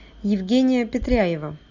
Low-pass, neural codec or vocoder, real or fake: 7.2 kHz; none; real